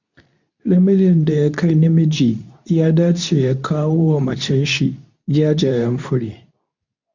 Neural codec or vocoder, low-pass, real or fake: codec, 24 kHz, 0.9 kbps, WavTokenizer, medium speech release version 2; 7.2 kHz; fake